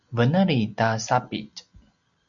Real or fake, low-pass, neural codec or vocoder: real; 7.2 kHz; none